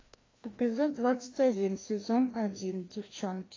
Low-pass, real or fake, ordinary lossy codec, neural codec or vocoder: 7.2 kHz; fake; AAC, 32 kbps; codec, 16 kHz, 1 kbps, FreqCodec, larger model